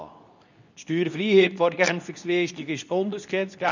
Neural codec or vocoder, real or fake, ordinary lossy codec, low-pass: codec, 24 kHz, 0.9 kbps, WavTokenizer, medium speech release version 2; fake; none; 7.2 kHz